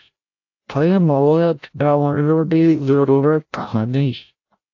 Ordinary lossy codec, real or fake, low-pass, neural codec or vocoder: AAC, 48 kbps; fake; 7.2 kHz; codec, 16 kHz, 0.5 kbps, FreqCodec, larger model